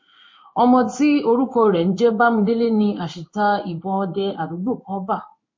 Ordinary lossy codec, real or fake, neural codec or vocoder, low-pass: MP3, 32 kbps; fake; codec, 16 kHz in and 24 kHz out, 1 kbps, XY-Tokenizer; 7.2 kHz